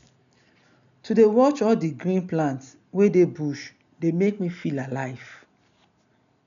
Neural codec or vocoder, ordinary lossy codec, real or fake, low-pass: none; none; real; 7.2 kHz